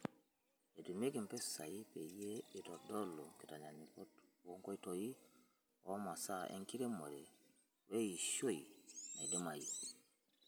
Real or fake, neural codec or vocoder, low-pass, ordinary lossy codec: real; none; none; none